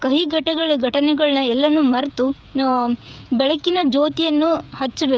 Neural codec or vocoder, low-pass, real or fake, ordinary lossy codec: codec, 16 kHz, 8 kbps, FreqCodec, smaller model; none; fake; none